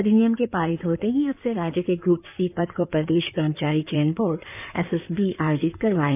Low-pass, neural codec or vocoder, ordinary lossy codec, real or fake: 3.6 kHz; codec, 16 kHz in and 24 kHz out, 2.2 kbps, FireRedTTS-2 codec; none; fake